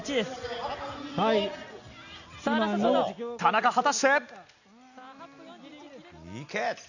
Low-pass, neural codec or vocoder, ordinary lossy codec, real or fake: 7.2 kHz; none; none; real